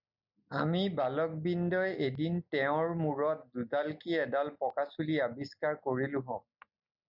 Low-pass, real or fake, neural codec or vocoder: 5.4 kHz; real; none